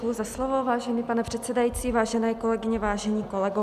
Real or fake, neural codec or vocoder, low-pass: real; none; 14.4 kHz